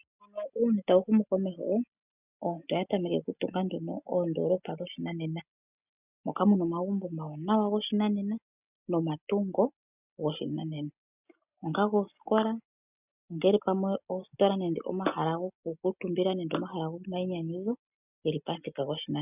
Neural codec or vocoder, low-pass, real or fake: none; 3.6 kHz; real